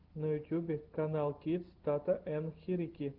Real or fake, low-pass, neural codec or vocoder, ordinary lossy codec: real; 5.4 kHz; none; Opus, 16 kbps